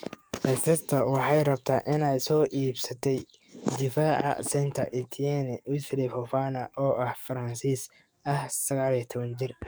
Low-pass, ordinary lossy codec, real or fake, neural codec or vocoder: none; none; fake; codec, 44.1 kHz, 7.8 kbps, DAC